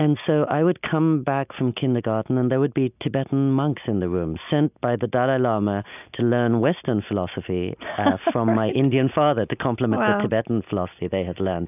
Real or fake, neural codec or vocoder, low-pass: real; none; 3.6 kHz